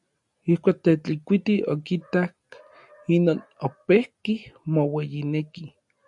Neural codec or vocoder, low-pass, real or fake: none; 10.8 kHz; real